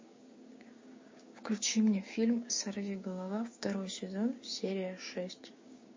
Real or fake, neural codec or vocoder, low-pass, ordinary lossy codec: fake; codec, 16 kHz, 6 kbps, DAC; 7.2 kHz; MP3, 32 kbps